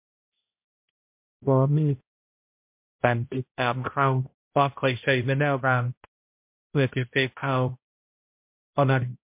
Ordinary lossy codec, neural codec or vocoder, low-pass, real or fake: MP3, 32 kbps; codec, 16 kHz, 0.5 kbps, X-Codec, HuBERT features, trained on balanced general audio; 3.6 kHz; fake